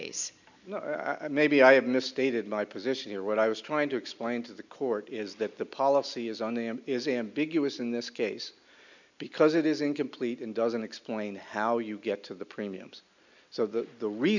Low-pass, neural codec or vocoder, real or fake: 7.2 kHz; none; real